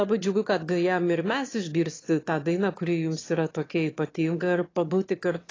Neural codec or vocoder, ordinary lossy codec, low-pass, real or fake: autoencoder, 22.05 kHz, a latent of 192 numbers a frame, VITS, trained on one speaker; AAC, 32 kbps; 7.2 kHz; fake